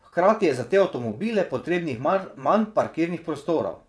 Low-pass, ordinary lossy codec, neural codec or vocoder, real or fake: none; none; vocoder, 22.05 kHz, 80 mel bands, WaveNeXt; fake